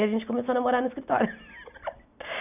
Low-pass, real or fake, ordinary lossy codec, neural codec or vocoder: 3.6 kHz; real; none; none